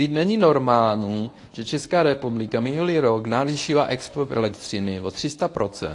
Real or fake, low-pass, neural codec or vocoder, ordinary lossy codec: fake; 10.8 kHz; codec, 24 kHz, 0.9 kbps, WavTokenizer, medium speech release version 1; AAC, 48 kbps